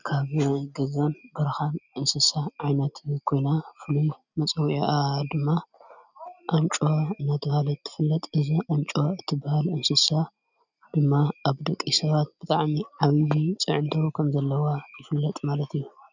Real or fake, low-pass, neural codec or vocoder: real; 7.2 kHz; none